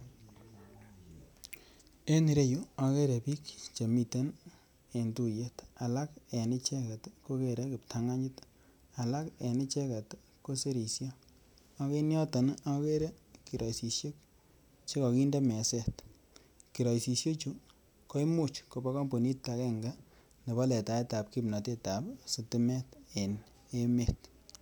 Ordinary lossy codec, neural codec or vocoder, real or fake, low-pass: none; none; real; none